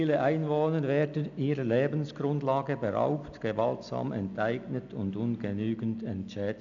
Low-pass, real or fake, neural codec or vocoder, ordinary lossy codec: 7.2 kHz; real; none; none